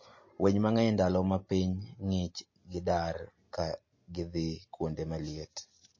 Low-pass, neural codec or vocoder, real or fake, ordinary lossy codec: 7.2 kHz; none; real; MP3, 32 kbps